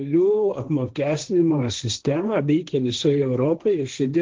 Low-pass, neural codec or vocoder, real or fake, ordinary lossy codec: 7.2 kHz; codec, 16 kHz, 1.1 kbps, Voila-Tokenizer; fake; Opus, 16 kbps